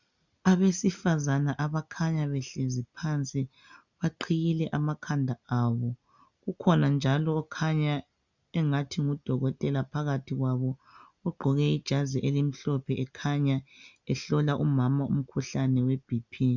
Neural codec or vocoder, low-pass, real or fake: none; 7.2 kHz; real